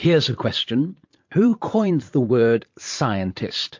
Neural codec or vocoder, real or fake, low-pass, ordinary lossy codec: vocoder, 44.1 kHz, 80 mel bands, Vocos; fake; 7.2 kHz; MP3, 48 kbps